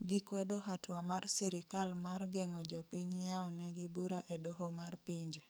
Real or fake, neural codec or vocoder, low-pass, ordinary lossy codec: fake; codec, 44.1 kHz, 2.6 kbps, SNAC; none; none